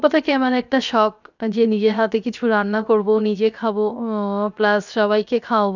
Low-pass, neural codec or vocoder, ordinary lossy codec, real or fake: 7.2 kHz; codec, 16 kHz, 0.7 kbps, FocalCodec; none; fake